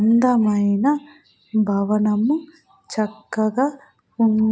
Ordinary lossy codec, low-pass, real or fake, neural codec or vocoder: none; none; real; none